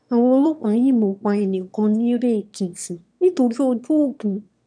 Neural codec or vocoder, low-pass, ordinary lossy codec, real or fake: autoencoder, 22.05 kHz, a latent of 192 numbers a frame, VITS, trained on one speaker; 9.9 kHz; none; fake